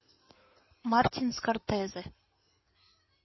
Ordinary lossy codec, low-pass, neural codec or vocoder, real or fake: MP3, 24 kbps; 7.2 kHz; codec, 16 kHz in and 24 kHz out, 2.2 kbps, FireRedTTS-2 codec; fake